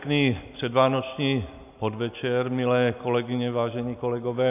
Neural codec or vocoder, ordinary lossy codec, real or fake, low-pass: none; AAC, 32 kbps; real; 3.6 kHz